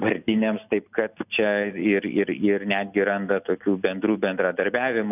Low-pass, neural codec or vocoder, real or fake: 3.6 kHz; none; real